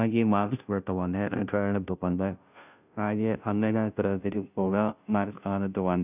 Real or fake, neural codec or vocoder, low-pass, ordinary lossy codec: fake; codec, 16 kHz, 0.5 kbps, FunCodec, trained on Chinese and English, 25 frames a second; 3.6 kHz; none